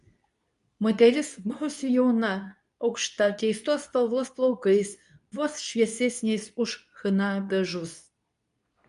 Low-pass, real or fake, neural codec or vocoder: 10.8 kHz; fake; codec, 24 kHz, 0.9 kbps, WavTokenizer, medium speech release version 2